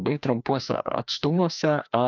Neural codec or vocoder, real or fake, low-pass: codec, 16 kHz, 1 kbps, FreqCodec, larger model; fake; 7.2 kHz